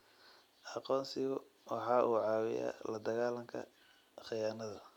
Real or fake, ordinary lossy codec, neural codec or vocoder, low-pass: real; none; none; 19.8 kHz